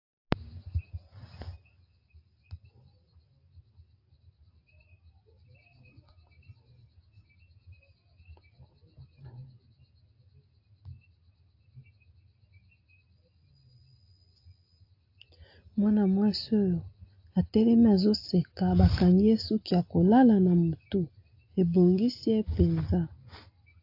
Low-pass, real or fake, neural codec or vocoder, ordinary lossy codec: 5.4 kHz; real; none; AAC, 32 kbps